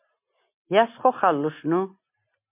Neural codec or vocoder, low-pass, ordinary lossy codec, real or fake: none; 3.6 kHz; MP3, 32 kbps; real